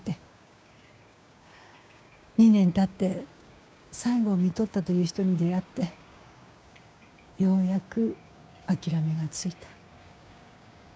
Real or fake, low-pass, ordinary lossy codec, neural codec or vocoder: fake; none; none; codec, 16 kHz, 6 kbps, DAC